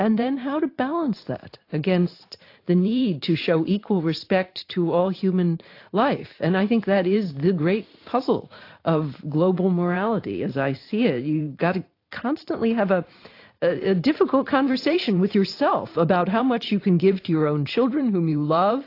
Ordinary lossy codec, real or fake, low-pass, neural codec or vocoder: AAC, 32 kbps; fake; 5.4 kHz; vocoder, 44.1 kHz, 128 mel bands every 512 samples, BigVGAN v2